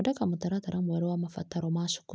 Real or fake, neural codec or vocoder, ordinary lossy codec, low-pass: real; none; none; none